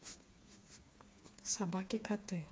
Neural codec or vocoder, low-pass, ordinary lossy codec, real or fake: codec, 16 kHz, 4 kbps, FreqCodec, smaller model; none; none; fake